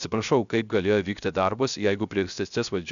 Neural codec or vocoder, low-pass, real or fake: codec, 16 kHz, 0.3 kbps, FocalCodec; 7.2 kHz; fake